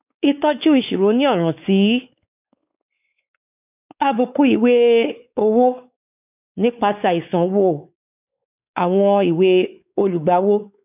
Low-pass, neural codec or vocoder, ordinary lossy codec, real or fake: 3.6 kHz; codec, 16 kHz, 4 kbps, X-Codec, WavLM features, trained on Multilingual LibriSpeech; none; fake